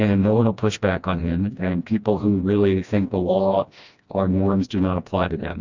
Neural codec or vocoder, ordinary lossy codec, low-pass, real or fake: codec, 16 kHz, 1 kbps, FreqCodec, smaller model; Opus, 64 kbps; 7.2 kHz; fake